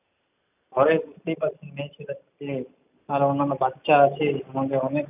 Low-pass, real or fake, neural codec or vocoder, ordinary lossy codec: 3.6 kHz; real; none; none